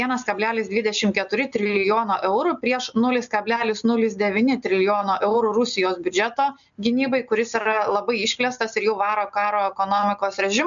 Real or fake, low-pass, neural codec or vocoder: real; 7.2 kHz; none